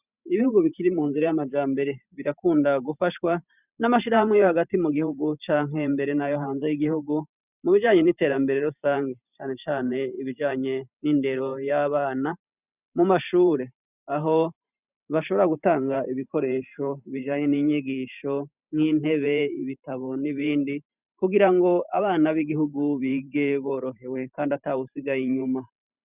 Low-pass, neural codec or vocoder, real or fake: 3.6 kHz; vocoder, 44.1 kHz, 128 mel bands every 512 samples, BigVGAN v2; fake